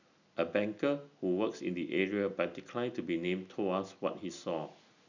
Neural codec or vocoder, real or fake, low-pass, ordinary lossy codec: none; real; 7.2 kHz; none